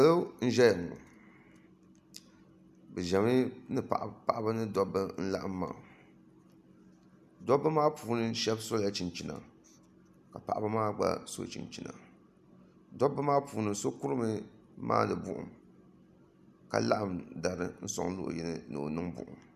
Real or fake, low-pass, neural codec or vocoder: real; 14.4 kHz; none